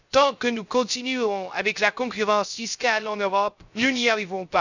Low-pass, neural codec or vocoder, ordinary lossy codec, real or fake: 7.2 kHz; codec, 16 kHz, 0.3 kbps, FocalCodec; none; fake